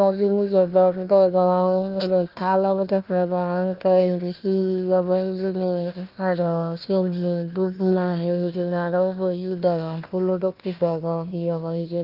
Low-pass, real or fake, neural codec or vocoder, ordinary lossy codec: 5.4 kHz; fake; codec, 16 kHz, 1 kbps, FunCodec, trained on Chinese and English, 50 frames a second; Opus, 24 kbps